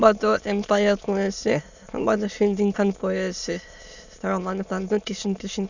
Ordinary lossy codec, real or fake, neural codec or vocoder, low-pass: none; fake; autoencoder, 22.05 kHz, a latent of 192 numbers a frame, VITS, trained on many speakers; 7.2 kHz